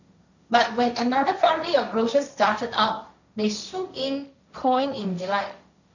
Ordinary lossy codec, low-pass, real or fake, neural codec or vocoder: none; 7.2 kHz; fake; codec, 16 kHz, 1.1 kbps, Voila-Tokenizer